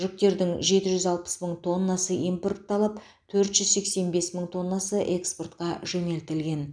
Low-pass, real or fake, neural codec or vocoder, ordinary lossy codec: 9.9 kHz; real; none; none